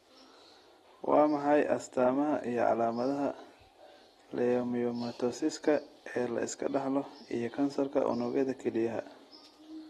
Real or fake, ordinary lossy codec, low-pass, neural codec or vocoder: real; AAC, 32 kbps; 19.8 kHz; none